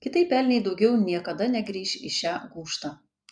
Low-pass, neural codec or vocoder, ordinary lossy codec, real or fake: 9.9 kHz; none; MP3, 96 kbps; real